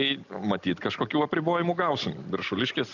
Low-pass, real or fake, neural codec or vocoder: 7.2 kHz; real; none